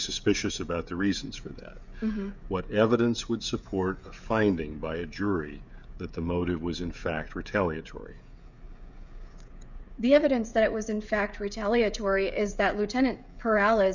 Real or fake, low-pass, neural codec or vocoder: fake; 7.2 kHz; codec, 16 kHz, 16 kbps, FreqCodec, smaller model